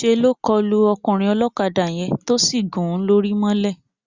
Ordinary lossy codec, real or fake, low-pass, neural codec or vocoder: Opus, 64 kbps; real; 7.2 kHz; none